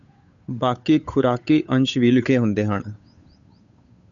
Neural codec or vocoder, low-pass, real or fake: codec, 16 kHz, 8 kbps, FunCodec, trained on Chinese and English, 25 frames a second; 7.2 kHz; fake